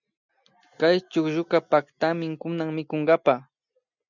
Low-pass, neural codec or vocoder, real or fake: 7.2 kHz; none; real